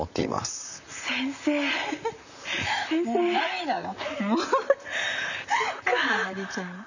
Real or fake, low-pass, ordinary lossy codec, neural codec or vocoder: fake; 7.2 kHz; none; vocoder, 44.1 kHz, 128 mel bands, Pupu-Vocoder